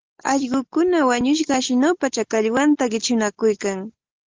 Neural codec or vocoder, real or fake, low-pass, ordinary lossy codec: none; real; 7.2 kHz; Opus, 16 kbps